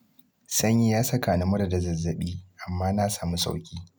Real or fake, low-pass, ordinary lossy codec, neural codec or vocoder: real; none; none; none